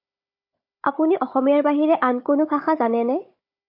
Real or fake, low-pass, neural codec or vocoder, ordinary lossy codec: fake; 5.4 kHz; codec, 16 kHz, 16 kbps, FunCodec, trained on Chinese and English, 50 frames a second; MP3, 32 kbps